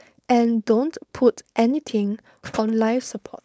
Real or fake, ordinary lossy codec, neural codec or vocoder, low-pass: fake; none; codec, 16 kHz, 4.8 kbps, FACodec; none